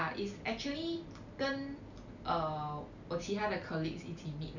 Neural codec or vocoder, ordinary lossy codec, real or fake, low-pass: none; none; real; 7.2 kHz